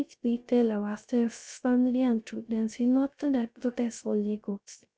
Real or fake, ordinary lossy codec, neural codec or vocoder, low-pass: fake; none; codec, 16 kHz, 0.3 kbps, FocalCodec; none